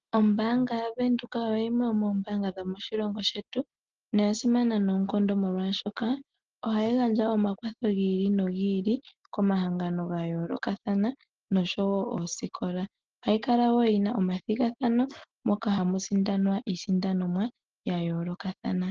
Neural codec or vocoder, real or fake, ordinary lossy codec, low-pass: none; real; Opus, 16 kbps; 7.2 kHz